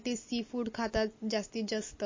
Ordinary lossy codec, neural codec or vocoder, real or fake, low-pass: MP3, 32 kbps; none; real; 7.2 kHz